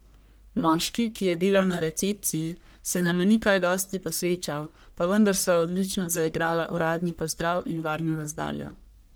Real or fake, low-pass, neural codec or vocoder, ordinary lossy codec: fake; none; codec, 44.1 kHz, 1.7 kbps, Pupu-Codec; none